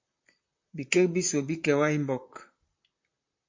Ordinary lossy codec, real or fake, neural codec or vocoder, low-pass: MP3, 48 kbps; fake; vocoder, 44.1 kHz, 128 mel bands, Pupu-Vocoder; 7.2 kHz